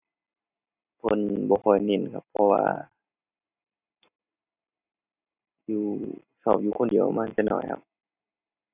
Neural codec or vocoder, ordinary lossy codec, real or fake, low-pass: none; none; real; 3.6 kHz